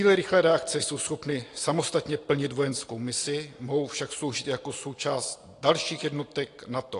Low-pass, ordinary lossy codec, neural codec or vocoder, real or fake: 10.8 kHz; AAC, 48 kbps; none; real